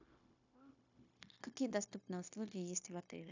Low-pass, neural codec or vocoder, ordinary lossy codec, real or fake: 7.2 kHz; codec, 16 kHz, 0.9 kbps, LongCat-Audio-Codec; AAC, 48 kbps; fake